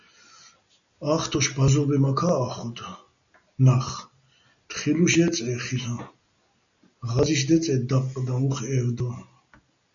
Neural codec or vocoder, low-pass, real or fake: none; 7.2 kHz; real